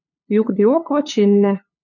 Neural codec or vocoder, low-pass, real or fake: codec, 16 kHz, 2 kbps, FunCodec, trained on LibriTTS, 25 frames a second; 7.2 kHz; fake